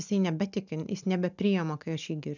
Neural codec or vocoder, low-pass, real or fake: none; 7.2 kHz; real